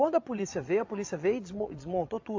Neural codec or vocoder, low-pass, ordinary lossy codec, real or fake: vocoder, 22.05 kHz, 80 mel bands, Vocos; 7.2 kHz; AAC, 48 kbps; fake